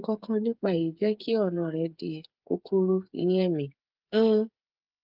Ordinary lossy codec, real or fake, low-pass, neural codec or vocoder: Opus, 32 kbps; fake; 5.4 kHz; codec, 16 kHz, 8 kbps, FreqCodec, smaller model